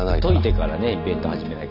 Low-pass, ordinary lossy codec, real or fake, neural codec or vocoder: 5.4 kHz; none; real; none